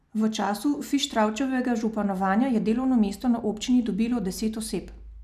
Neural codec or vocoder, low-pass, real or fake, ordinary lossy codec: none; 14.4 kHz; real; none